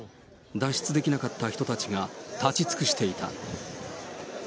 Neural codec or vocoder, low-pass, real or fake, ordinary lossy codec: none; none; real; none